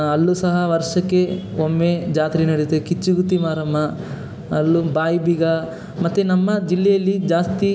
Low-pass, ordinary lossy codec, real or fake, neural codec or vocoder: none; none; real; none